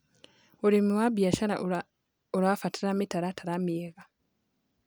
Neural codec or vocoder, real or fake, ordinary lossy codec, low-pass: none; real; none; none